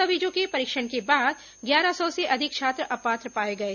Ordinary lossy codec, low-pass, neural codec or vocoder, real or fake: none; 7.2 kHz; none; real